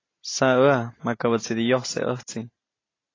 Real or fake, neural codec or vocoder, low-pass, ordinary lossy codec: real; none; 7.2 kHz; AAC, 32 kbps